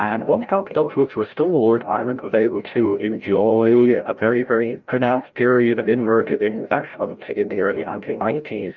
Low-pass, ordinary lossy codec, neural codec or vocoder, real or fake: 7.2 kHz; Opus, 24 kbps; codec, 16 kHz, 0.5 kbps, FreqCodec, larger model; fake